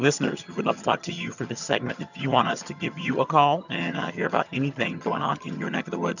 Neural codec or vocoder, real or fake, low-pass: vocoder, 22.05 kHz, 80 mel bands, HiFi-GAN; fake; 7.2 kHz